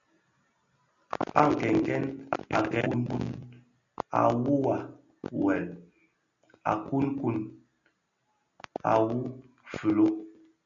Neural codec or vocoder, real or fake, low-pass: none; real; 7.2 kHz